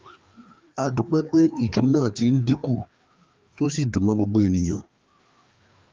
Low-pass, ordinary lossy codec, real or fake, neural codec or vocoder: 7.2 kHz; Opus, 32 kbps; fake; codec, 16 kHz, 2 kbps, FreqCodec, larger model